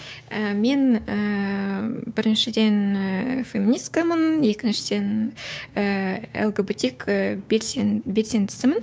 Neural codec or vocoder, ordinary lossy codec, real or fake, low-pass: codec, 16 kHz, 6 kbps, DAC; none; fake; none